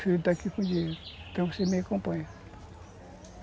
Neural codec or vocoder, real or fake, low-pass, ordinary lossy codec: none; real; none; none